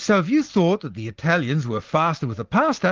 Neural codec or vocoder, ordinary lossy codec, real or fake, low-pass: none; Opus, 16 kbps; real; 7.2 kHz